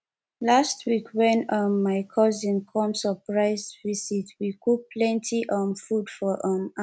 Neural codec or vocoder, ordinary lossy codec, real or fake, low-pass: none; none; real; none